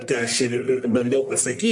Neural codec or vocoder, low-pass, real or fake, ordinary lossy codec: codec, 44.1 kHz, 1.7 kbps, Pupu-Codec; 10.8 kHz; fake; MP3, 64 kbps